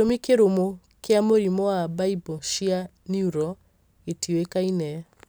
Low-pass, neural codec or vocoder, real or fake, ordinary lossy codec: none; none; real; none